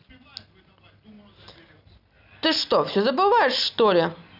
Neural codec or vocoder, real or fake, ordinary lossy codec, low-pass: none; real; none; 5.4 kHz